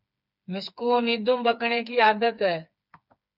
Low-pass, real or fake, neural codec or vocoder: 5.4 kHz; fake; codec, 16 kHz, 4 kbps, FreqCodec, smaller model